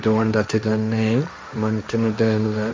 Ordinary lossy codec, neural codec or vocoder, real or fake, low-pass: none; codec, 16 kHz, 1.1 kbps, Voila-Tokenizer; fake; none